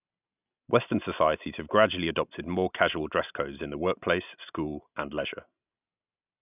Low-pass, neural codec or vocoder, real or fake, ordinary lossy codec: 3.6 kHz; none; real; none